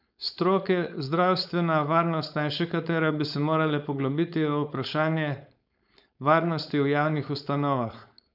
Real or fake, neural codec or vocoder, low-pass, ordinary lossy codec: fake; codec, 16 kHz, 4.8 kbps, FACodec; 5.4 kHz; none